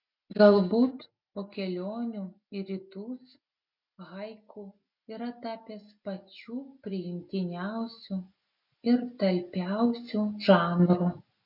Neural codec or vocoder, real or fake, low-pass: none; real; 5.4 kHz